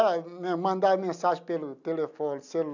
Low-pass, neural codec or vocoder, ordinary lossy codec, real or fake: 7.2 kHz; none; none; real